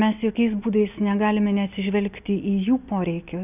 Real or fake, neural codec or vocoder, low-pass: real; none; 3.6 kHz